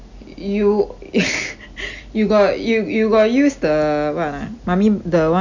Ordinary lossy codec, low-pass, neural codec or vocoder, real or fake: none; 7.2 kHz; none; real